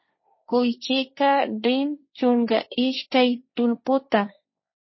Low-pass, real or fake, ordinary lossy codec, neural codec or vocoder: 7.2 kHz; fake; MP3, 24 kbps; codec, 16 kHz, 1.1 kbps, Voila-Tokenizer